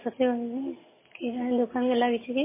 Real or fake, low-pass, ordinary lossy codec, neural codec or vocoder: real; 3.6 kHz; MP3, 16 kbps; none